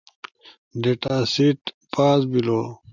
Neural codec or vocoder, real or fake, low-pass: none; real; 7.2 kHz